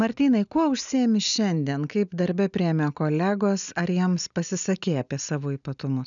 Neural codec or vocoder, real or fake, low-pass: none; real; 7.2 kHz